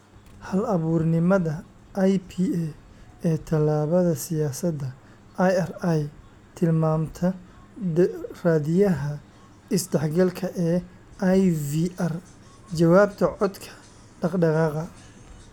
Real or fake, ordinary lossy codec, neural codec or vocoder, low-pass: real; none; none; 19.8 kHz